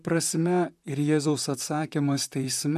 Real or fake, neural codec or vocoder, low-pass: fake; vocoder, 44.1 kHz, 128 mel bands, Pupu-Vocoder; 14.4 kHz